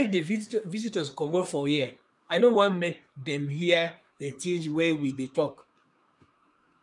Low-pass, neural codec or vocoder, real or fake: 10.8 kHz; codec, 24 kHz, 1 kbps, SNAC; fake